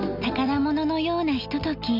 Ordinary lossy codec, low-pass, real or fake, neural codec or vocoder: none; 5.4 kHz; real; none